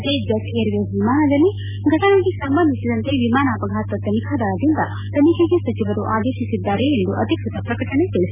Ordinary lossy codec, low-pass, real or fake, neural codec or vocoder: none; 3.6 kHz; real; none